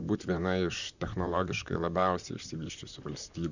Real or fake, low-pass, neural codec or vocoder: fake; 7.2 kHz; vocoder, 44.1 kHz, 128 mel bands, Pupu-Vocoder